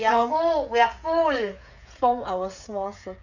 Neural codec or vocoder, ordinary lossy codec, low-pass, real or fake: codec, 16 kHz, 16 kbps, FreqCodec, smaller model; none; 7.2 kHz; fake